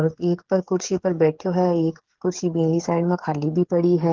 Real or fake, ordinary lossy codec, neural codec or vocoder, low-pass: fake; Opus, 16 kbps; codec, 16 kHz, 8 kbps, FreqCodec, smaller model; 7.2 kHz